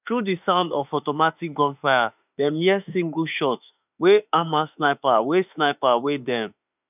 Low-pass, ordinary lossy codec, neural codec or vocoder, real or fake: 3.6 kHz; none; autoencoder, 48 kHz, 32 numbers a frame, DAC-VAE, trained on Japanese speech; fake